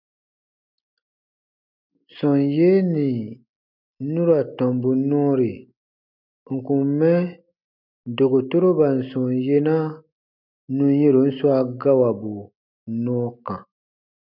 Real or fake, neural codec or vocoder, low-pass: real; none; 5.4 kHz